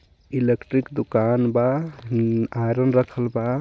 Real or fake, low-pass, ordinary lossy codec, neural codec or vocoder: real; none; none; none